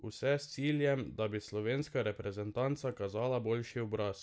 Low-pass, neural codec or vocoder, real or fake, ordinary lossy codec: none; none; real; none